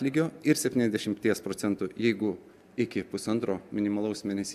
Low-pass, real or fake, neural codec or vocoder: 14.4 kHz; real; none